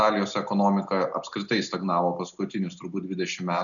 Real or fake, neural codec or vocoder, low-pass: real; none; 7.2 kHz